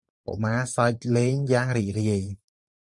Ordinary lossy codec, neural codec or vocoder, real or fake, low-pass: MP3, 64 kbps; vocoder, 44.1 kHz, 128 mel bands every 512 samples, BigVGAN v2; fake; 10.8 kHz